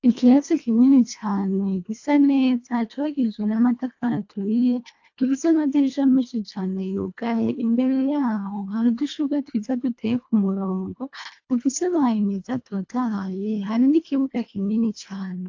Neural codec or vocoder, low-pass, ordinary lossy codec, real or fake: codec, 24 kHz, 1.5 kbps, HILCodec; 7.2 kHz; AAC, 48 kbps; fake